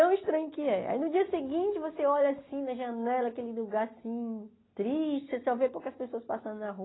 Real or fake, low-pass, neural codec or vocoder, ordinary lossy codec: real; 7.2 kHz; none; AAC, 16 kbps